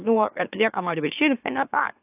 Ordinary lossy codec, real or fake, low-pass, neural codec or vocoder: AAC, 32 kbps; fake; 3.6 kHz; autoencoder, 44.1 kHz, a latent of 192 numbers a frame, MeloTTS